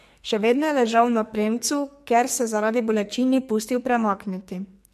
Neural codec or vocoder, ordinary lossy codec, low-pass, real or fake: codec, 44.1 kHz, 2.6 kbps, SNAC; MP3, 64 kbps; 14.4 kHz; fake